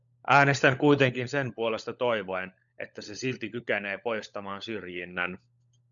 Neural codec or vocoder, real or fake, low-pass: codec, 16 kHz, 16 kbps, FunCodec, trained on LibriTTS, 50 frames a second; fake; 7.2 kHz